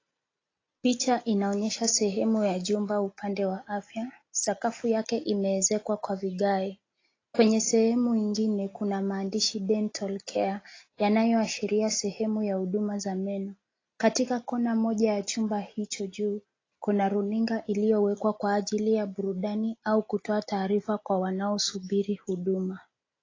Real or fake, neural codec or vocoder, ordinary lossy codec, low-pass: real; none; AAC, 32 kbps; 7.2 kHz